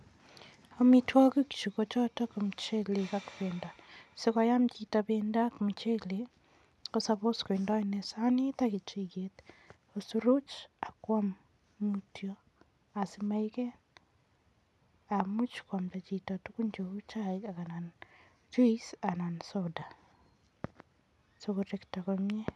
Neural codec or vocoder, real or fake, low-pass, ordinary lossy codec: none; real; none; none